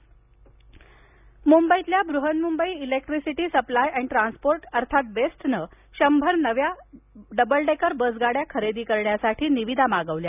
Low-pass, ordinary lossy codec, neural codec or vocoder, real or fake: 3.6 kHz; none; none; real